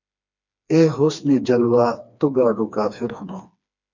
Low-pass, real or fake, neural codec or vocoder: 7.2 kHz; fake; codec, 16 kHz, 2 kbps, FreqCodec, smaller model